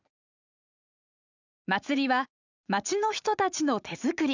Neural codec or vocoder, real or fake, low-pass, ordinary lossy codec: vocoder, 44.1 kHz, 80 mel bands, Vocos; fake; 7.2 kHz; none